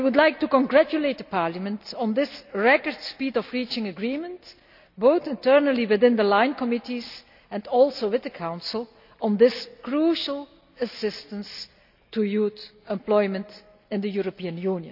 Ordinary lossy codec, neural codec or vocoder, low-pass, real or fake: none; none; 5.4 kHz; real